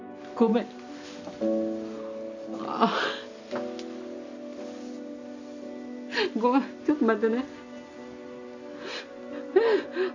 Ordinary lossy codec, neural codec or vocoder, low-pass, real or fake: AAC, 48 kbps; none; 7.2 kHz; real